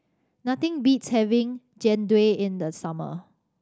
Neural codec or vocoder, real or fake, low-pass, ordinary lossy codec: none; real; none; none